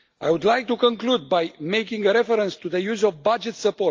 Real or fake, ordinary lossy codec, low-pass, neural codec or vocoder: real; Opus, 24 kbps; 7.2 kHz; none